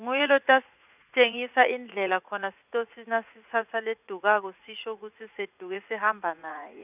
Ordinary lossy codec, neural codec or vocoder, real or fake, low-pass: none; vocoder, 44.1 kHz, 80 mel bands, Vocos; fake; 3.6 kHz